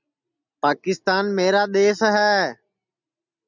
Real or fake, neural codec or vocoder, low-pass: real; none; 7.2 kHz